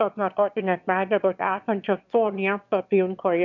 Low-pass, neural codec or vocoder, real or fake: 7.2 kHz; autoencoder, 22.05 kHz, a latent of 192 numbers a frame, VITS, trained on one speaker; fake